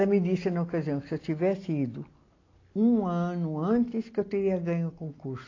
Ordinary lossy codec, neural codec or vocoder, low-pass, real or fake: AAC, 32 kbps; none; 7.2 kHz; real